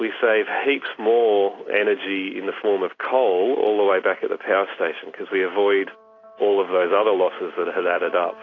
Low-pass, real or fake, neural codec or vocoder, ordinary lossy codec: 7.2 kHz; real; none; AAC, 32 kbps